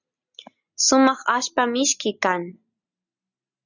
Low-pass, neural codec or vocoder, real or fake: 7.2 kHz; none; real